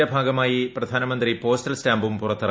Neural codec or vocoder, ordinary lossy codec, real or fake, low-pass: none; none; real; none